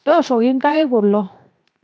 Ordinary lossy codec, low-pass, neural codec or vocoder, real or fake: none; none; codec, 16 kHz, 0.7 kbps, FocalCodec; fake